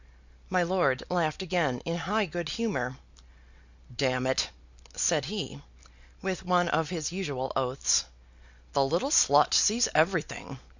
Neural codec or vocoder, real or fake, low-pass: none; real; 7.2 kHz